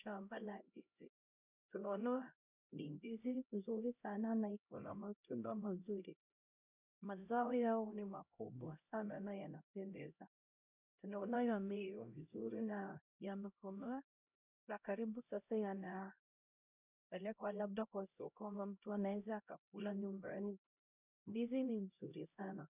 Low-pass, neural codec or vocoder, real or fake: 3.6 kHz; codec, 16 kHz, 0.5 kbps, X-Codec, HuBERT features, trained on LibriSpeech; fake